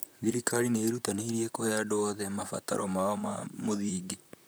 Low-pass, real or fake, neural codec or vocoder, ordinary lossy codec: none; fake; vocoder, 44.1 kHz, 128 mel bands, Pupu-Vocoder; none